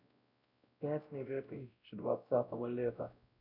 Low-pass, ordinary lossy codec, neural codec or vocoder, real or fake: 5.4 kHz; none; codec, 16 kHz, 0.5 kbps, X-Codec, WavLM features, trained on Multilingual LibriSpeech; fake